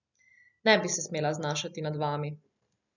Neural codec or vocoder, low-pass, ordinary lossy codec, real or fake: none; 7.2 kHz; none; real